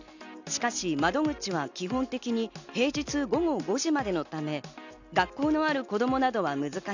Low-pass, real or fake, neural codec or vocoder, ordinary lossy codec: 7.2 kHz; real; none; none